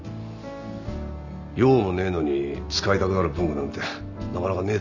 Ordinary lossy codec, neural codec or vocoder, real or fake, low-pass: none; none; real; 7.2 kHz